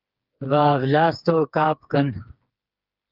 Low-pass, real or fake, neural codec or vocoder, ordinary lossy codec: 5.4 kHz; fake; codec, 16 kHz, 4 kbps, FreqCodec, smaller model; Opus, 24 kbps